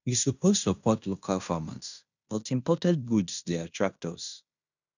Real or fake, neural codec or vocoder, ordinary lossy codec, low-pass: fake; codec, 16 kHz in and 24 kHz out, 0.9 kbps, LongCat-Audio-Codec, fine tuned four codebook decoder; none; 7.2 kHz